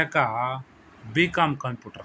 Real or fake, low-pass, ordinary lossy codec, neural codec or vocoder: real; none; none; none